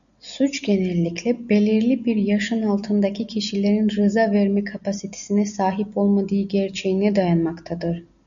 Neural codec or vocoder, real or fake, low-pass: none; real; 7.2 kHz